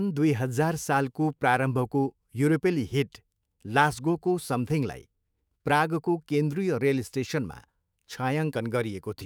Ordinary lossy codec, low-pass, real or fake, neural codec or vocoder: none; none; fake; autoencoder, 48 kHz, 128 numbers a frame, DAC-VAE, trained on Japanese speech